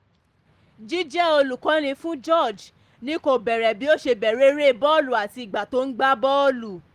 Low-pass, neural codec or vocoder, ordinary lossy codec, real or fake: 14.4 kHz; none; Opus, 24 kbps; real